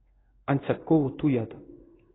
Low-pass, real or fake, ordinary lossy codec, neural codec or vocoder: 7.2 kHz; fake; AAC, 16 kbps; codec, 16 kHz in and 24 kHz out, 1 kbps, XY-Tokenizer